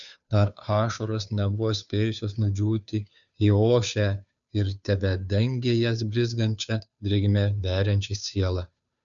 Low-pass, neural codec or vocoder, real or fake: 7.2 kHz; codec, 16 kHz, 2 kbps, FunCodec, trained on Chinese and English, 25 frames a second; fake